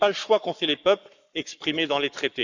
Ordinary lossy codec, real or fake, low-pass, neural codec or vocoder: none; fake; 7.2 kHz; codec, 44.1 kHz, 7.8 kbps, Pupu-Codec